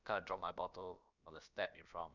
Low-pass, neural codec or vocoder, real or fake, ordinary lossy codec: 7.2 kHz; codec, 16 kHz, about 1 kbps, DyCAST, with the encoder's durations; fake; none